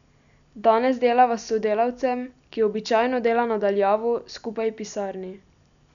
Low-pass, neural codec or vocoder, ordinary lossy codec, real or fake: 7.2 kHz; none; none; real